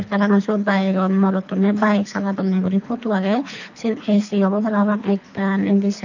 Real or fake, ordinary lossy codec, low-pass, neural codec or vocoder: fake; none; 7.2 kHz; codec, 24 kHz, 3 kbps, HILCodec